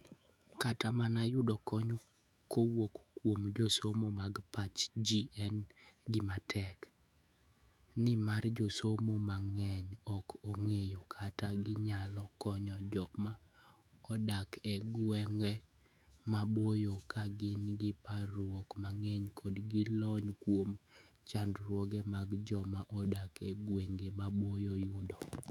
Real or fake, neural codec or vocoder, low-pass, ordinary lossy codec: fake; autoencoder, 48 kHz, 128 numbers a frame, DAC-VAE, trained on Japanese speech; 19.8 kHz; none